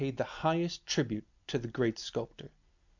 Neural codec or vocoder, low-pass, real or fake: none; 7.2 kHz; real